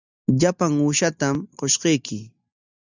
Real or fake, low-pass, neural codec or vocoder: real; 7.2 kHz; none